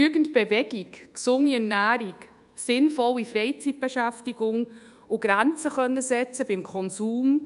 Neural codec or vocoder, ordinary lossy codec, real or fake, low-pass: codec, 24 kHz, 1.2 kbps, DualCodec; none; fake; 10.8 kHz